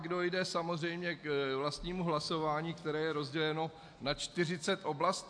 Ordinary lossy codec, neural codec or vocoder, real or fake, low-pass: AAC, 64 kbps; none; real; 9.9 kHz